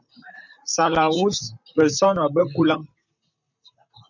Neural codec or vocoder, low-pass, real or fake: vocoder, 44.1 kHz, 128 mel bands, Pupu-Vocoder; 7.2 kHz; fake